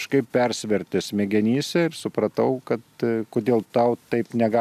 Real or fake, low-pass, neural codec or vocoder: real; 14.4 kHz; none